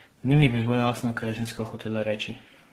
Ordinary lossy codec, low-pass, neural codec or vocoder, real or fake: Opus, 16 kbps; 14.4 kHz; codec, 32 kHz, 1.9 kbps, SNAC; fake